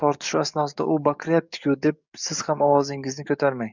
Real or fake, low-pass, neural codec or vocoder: fake; 7.2 kHz; codec, 44.1 kHz, 7.8 kbps, DAC